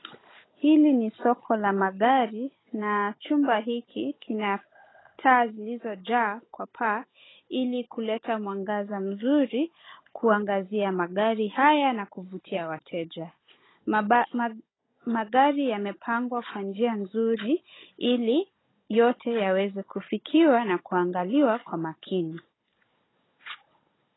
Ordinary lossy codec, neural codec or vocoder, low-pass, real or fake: AAC, 16 kbps; codec, 16 kHz, 16 kbps, FunCodec, trained on Chinese and English, 50 frames a second; 7.2 kHz; fake